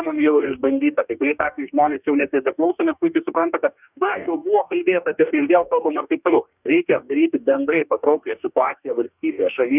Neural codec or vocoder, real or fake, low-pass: codec, 44.1 kHz, 2.6 kbps, DAC; fake; 3.6 kHz